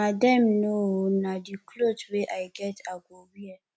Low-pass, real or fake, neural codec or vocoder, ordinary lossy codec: none; real; none; none